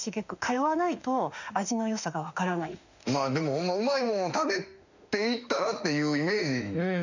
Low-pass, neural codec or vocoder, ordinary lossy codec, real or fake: 7.2 kHz; autoencoder, 48 kHz, 32 numbers a frame, DAC-VAE, trained on Japanese speech; none; fake